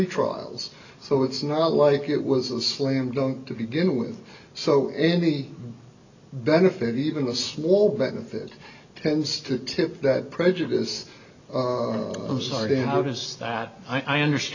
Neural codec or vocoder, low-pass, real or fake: none; 7.2 kHz; real